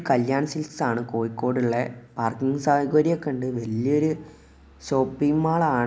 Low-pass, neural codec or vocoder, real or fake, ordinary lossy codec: none; none; real; none